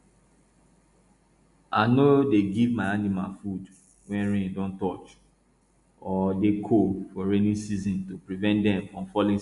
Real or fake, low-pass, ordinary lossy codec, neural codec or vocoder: real; 10.8 kHz; MP3, 64 kbps; none